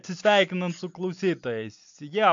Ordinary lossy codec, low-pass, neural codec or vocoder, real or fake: AAC, 64 kbps; 7.2 kHz; none; real